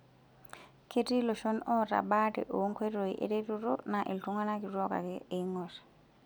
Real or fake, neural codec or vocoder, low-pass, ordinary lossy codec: real; none; none; none